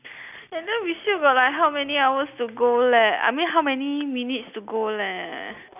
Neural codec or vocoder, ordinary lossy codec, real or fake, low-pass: none; none; real; 3.6 kHz